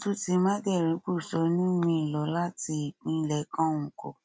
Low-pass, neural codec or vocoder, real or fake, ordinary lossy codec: none; none; real; none